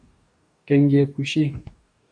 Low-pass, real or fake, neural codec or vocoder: 9.9 kHz; fake; codec, 44.1 kHz, 2.6 kbps, DAC